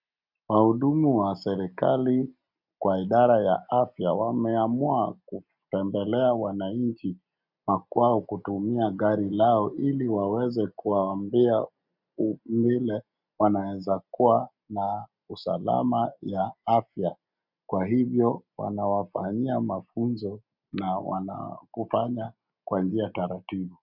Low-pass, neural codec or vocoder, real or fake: 5.4 kHz; none; real